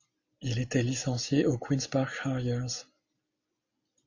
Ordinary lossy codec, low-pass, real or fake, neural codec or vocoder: AAC, 48 kbps; 7.2 kHz; real; none